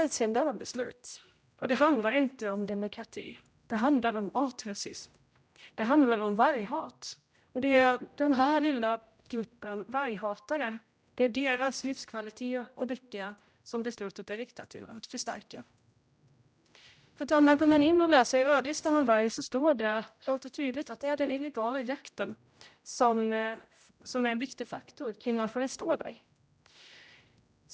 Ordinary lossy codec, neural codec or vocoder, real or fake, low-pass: none; codec, 16 kHz, 0.5 kbps, X-Codec, HuBERT features, trained on general audio; fake; none